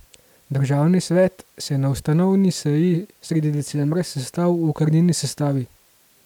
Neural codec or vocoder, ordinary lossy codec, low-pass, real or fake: none; none; 19.8 kHz; real